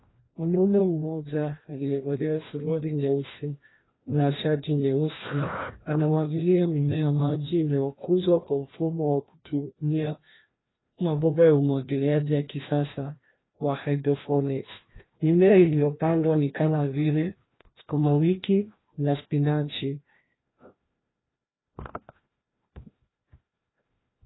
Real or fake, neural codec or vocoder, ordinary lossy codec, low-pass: fake; codec, 16 kHz, 1 kbps, FreqCodec, larger model; AAC, 16 kbps; 7.2 kHz